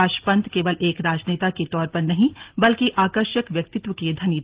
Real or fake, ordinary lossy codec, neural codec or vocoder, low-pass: real; Opus, 16 kbps; none; 3.6 kHz